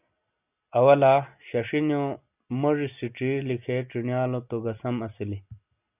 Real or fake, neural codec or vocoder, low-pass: real; none; 3.6 kHz